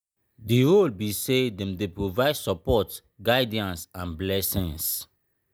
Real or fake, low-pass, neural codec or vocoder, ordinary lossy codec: real; none; none; none